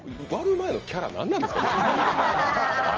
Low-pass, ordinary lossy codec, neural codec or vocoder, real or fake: 7.2 kHz; Opus, 24 kbps; none; real